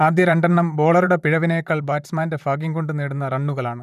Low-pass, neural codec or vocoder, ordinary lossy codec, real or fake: 14.4 kHz; vocoder, 44.1 kHz, 128 mel bands, Pupu-Vocoder; none; fake